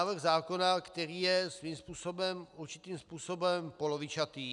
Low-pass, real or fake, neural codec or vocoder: 10.8 kHz; real; none